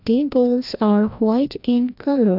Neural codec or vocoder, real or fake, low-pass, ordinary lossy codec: codec, 16 kHz, 1 kbps, FreqCodec, larger model; fake; 5.4 kHz; none